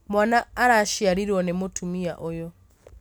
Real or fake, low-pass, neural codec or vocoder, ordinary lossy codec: real; none; none; none